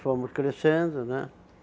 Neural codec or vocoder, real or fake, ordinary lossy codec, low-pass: none; real; none; none